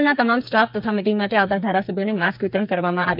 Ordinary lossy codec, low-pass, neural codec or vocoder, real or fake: none; 5.4 kHz; codec, 44.1 kHz, 2.6 kbps, SNAC; fake